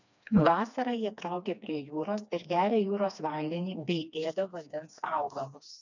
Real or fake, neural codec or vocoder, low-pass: fake; codec, 16 kHz, 2 kbps, FreqCodec, smaller model; 7.2 kHz